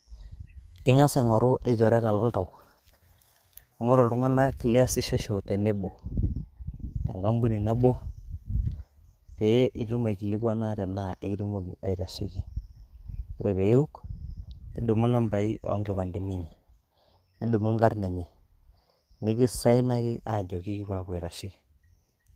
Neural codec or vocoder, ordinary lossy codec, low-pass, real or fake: codec, 32 kHz, 1.9 kbps, SNAC; Opus, 32 kbps; 14.4 kHz; fake